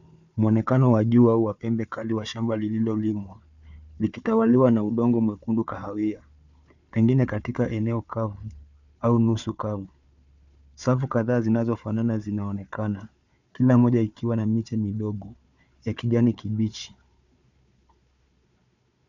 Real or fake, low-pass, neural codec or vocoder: fake; 7.2 kHz; codec, 16 kHz, 4 kbps, FunCodec, trained on Chinese and English, 50 frames a second